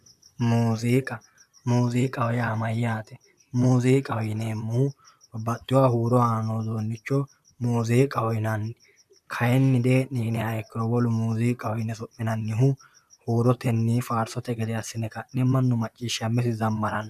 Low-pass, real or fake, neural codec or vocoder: 14.4 kHz; fake; vocoder, 44.1 kHz, 128 mel bands, Pupu-Vocoder